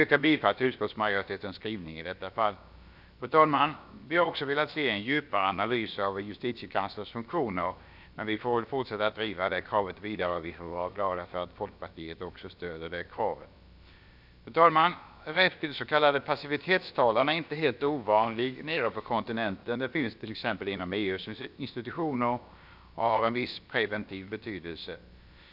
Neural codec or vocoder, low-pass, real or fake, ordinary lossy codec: codec, 16 kHz, about 1 kbps, DyCAST, with the encoder's durations; 5.4 kHz; fake; none